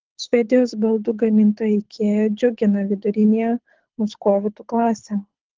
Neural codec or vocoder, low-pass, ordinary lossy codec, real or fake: codec, 24 kHz, 6 kbps, HILCodec; 7.2 kHz; Opus, 16 kbps; fake